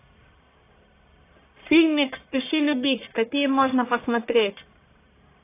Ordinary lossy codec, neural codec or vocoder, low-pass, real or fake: AAC, 24 kbps; codec, 44.1 kHz, 1.7 kbps, Pupu-Codec; 3.6 kHz; fake